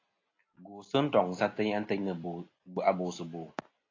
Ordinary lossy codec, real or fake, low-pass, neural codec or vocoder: AAC, 32 kbps; real; 7.2 kHz; none